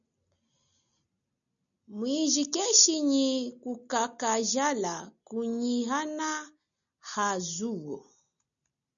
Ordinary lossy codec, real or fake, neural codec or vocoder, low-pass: MP3, 96 kbps; real; none; 7.2 kHz